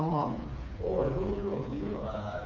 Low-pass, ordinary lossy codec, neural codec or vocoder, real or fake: 7.2 kHz; none; codec, 16 kHz, 4 kbps, FreqCodec, smaller model; fake